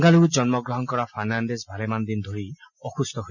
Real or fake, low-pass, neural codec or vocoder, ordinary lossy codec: real; 7.2 kHz; none; none